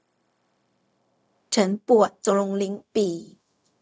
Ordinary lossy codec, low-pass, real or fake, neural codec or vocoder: none; none; fake; codec, 16 kHz, 0.4 kbps, LongCat-Audio-Codec